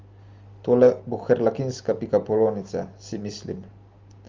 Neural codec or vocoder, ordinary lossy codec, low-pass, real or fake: none; Opus, 32 kbps; 7.2 kHz; real